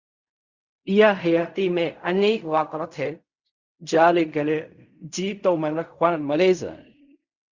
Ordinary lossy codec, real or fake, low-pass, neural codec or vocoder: Opus, 64 kbps; fake; 7.2 kHz; codec, 16 kHz in and 24 kHz out, 0.4 kbps, LongCat-Audio-Codec, fine tuned four codebook decoder